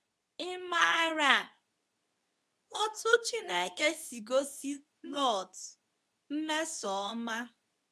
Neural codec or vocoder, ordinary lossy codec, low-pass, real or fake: codec, 24 kHz, 0.9 kbps, WavTokenizer, medium speech release version 2; none; none; fake